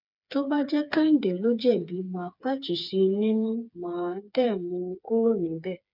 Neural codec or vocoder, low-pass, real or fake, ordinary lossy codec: codec, 16 kHz, 4 kbps, FreqCodec, smaller model; 5.4 kHz; fake; none